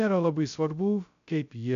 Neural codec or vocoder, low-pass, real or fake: codec, 16 kHz, 0.2 kbps, FocalCodec; 7.2 kHz; fake